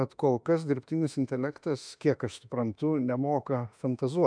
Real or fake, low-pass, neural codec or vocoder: fake; 9.9 kHz; autoencoder, 48 kHz, 32 numbers a frame, DAC-VAE, trained on Japanese speech